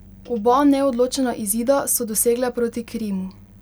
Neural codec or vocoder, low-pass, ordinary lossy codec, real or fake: none; none; none; real